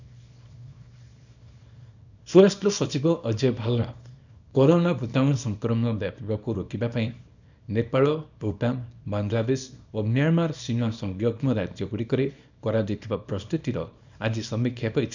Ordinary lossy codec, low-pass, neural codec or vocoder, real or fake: none; 7.2 kHz; codec, 24 kHz, 0.9 kbps, WavTokenizer, small release; fake